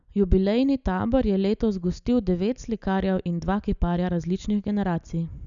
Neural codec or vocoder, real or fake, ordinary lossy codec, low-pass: none; real; none; 7.2 kHz